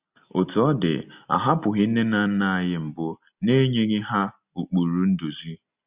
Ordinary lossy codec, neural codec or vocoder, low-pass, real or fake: Opus, 64 kbps; none; 3.6 kHz; real